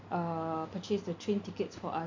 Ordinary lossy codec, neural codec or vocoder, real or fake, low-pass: MP3, 64 kbps; none; real; 7.2 kHz